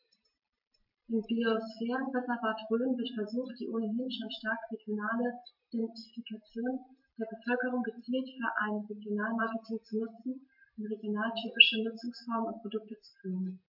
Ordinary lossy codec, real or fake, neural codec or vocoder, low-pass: MP3, 48 kbps; real; none; 5.4 kHz